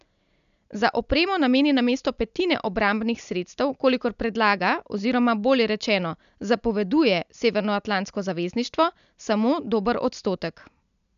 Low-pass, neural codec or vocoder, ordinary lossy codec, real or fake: 7.2 kHz; none; none; real